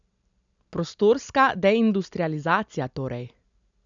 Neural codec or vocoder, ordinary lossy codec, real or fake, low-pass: none; none; real; 7.2 kHz